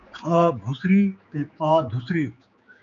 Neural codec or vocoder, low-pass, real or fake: codec, 16 kHz, 4 kbps, X-Codec, HuBERT features, trained on general audio; 7.2 kHz; fake